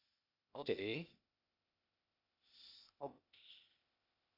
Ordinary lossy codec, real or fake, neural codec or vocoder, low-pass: none; fake; codec, 16 kHz, 0.8 kbps, ZipCodec; 5.4 kHz